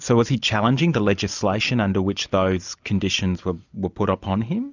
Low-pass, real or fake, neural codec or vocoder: 7.2 kHz; real; none